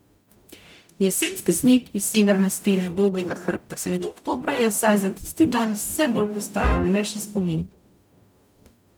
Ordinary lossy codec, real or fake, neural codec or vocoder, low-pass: none; fake; codec, 44.1 kHz, 0.9 kbps, DAC; none